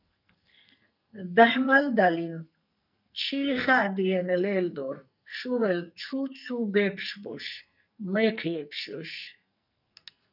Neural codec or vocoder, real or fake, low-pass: codec, 44.1 kHz, 2.6 kbps, SNAC; fake; 5.4 kHz